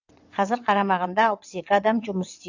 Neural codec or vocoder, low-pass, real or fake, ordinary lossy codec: vocoder, 22.05 kHz, 80 mel bands, WaveNeXt; 7.2 kHz; fake; AAC, 48 kbps